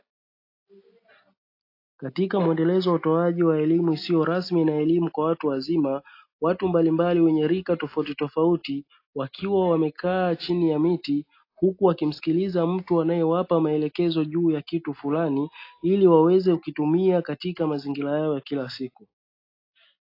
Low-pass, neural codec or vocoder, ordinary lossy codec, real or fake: 5.4 kHz; none; AAC, 32 kbps; real